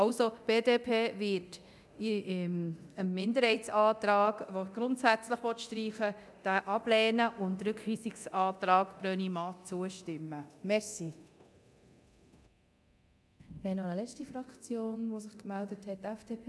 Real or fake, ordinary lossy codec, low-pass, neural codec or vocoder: fake; none; none; codec, 24 kHz, 0.9 kbps, DualCodec